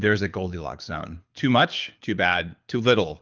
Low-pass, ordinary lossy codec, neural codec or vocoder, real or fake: 7.2 kHz; Opus, 24 kbps; codec, 24 kHz, 6 kbps, HILCodec; fake